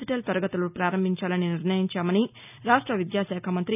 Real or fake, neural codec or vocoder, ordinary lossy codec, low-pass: real; none; none; 3.6 kHz